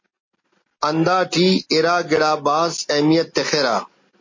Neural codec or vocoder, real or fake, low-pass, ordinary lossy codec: none; real; 7.2 kHz; MP3, 32 kbps